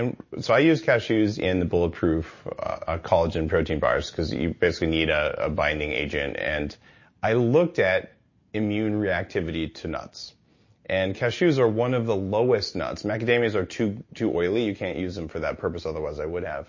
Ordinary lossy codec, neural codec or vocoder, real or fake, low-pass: MP3, 32 kbps; none; real; 7.2 kHz